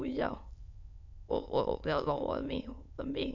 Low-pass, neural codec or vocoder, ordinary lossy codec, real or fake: 7.2 kHz; autoencoder, 22.05 kHz, a latent of 192 numbers a frame, VITS, trained on many speakers; none; fake